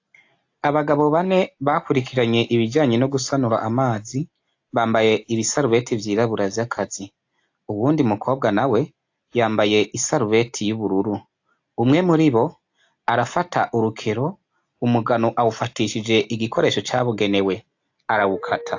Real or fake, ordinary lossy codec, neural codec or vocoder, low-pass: real; AAC, 48 kbps; none; 7.2 kHz